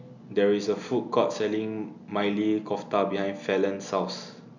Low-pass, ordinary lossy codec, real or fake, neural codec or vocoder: 7.2 kHz; none; real; none